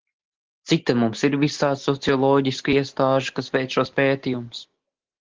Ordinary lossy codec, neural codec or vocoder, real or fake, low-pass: Opus, 16 kbps; none; real; 7.2 kHz